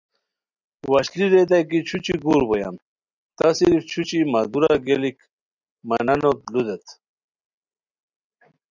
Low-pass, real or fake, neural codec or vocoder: 7.2 kHz; real; none